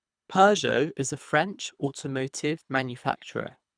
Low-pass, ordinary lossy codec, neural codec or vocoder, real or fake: 9.9 kHz; none; codec, 24 kHz, 3 kbps, HILCodec; fake